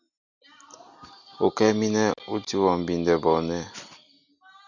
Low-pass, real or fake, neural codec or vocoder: 7.2 kHz; real; none